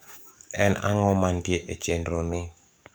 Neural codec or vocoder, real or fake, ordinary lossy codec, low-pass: codec, 44.1 kHz, 7.8 kbps, DAC; fake; none; none